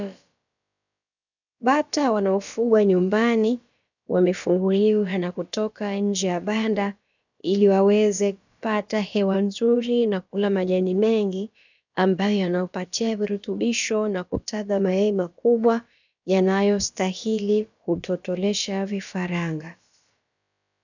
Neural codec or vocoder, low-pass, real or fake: codec, 16 kHz, about 1 kbps, DyCAST, with the encoder's durations; 7.2 kHz; fake